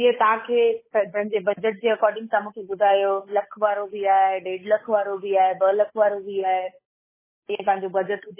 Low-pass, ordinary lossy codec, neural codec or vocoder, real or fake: 3.6 kHz; MP3, 16 kbps; codec, 16 kHz, 8 kbps, FunCodec, trained on Chinese and English, 25 frames a second; fake